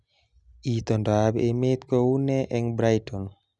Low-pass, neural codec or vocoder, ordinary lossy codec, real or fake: 10.8 kHz; none; none; real